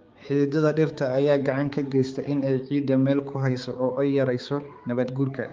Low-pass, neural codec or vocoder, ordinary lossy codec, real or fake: 7.2 kHz; codec, 16 kHz, 4 kbps, X-Codec, HuBERT features, trained on balanced general audio; Opus, 32 kbps; fake